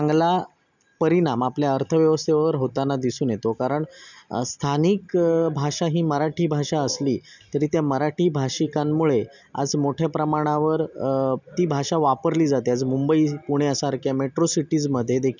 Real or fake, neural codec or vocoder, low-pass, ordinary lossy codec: real; none; 7.2 kHz; none